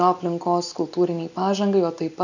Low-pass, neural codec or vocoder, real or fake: 7.2 kHz; none; real